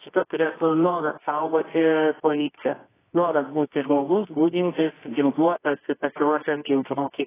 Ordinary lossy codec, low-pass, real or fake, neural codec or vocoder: AAC, 16 kbps; 3.6 kHz; fake; codec, 24 kHz, 0.9 kbps, WavTokenizer, medium music audio release